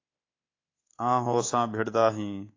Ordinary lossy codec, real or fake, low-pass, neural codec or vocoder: AAC, 32 kbps; fake; 7.2 kHz; codec, 24 kHz, 3.1 kbps, DualCodec